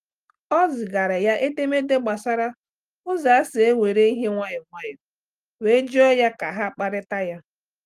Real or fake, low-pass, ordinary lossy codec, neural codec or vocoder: real; 14.4 kHz; Opus, 32 kbps; none